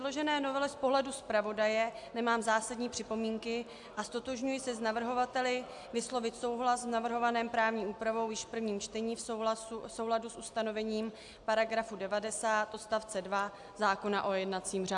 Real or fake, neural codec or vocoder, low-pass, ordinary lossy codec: real; none; 10.8 kHz; AAC, 64 kbps